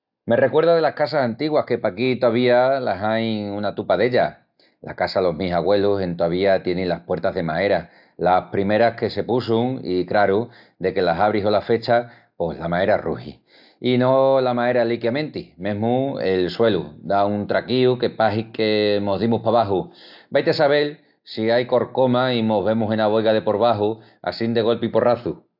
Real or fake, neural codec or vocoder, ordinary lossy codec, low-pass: real; none; AAC, 48 kbps; 5.4 kHz